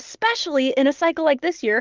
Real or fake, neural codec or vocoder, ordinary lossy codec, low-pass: real; none; Opus, 32 kbps; 7.2 kHz